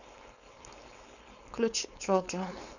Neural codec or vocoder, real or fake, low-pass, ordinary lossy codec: codec, 16 kHz, 4.8 kbps, FACodec; fake; 7.2 kHz; none